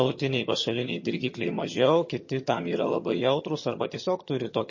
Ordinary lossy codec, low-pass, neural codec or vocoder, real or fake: MP3, 32 kbps; 7.2 kHz; vocoder, 22.05 kHz, 80 mel bands, HiFi-GAN; fake